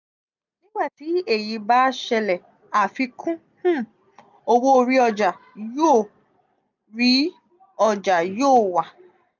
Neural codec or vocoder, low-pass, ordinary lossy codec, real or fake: none; 7.2 kHz; none; real